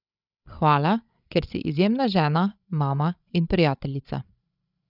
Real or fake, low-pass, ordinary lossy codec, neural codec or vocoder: fake; 5.4 kHz; none; codec, 16 kHz, 16 kbps, FreqCodec, larger model